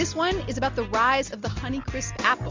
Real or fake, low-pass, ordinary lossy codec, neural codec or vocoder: real; 7.2 kHz; MP3, 64 kbps; none